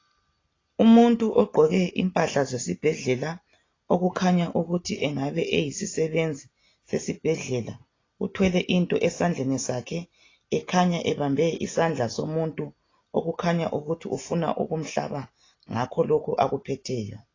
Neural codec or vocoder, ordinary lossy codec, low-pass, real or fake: none; AAC, 32 kbps; 7.2 kHz; real